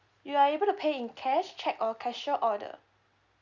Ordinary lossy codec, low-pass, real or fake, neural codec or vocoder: none; 7.2 kHz; real; none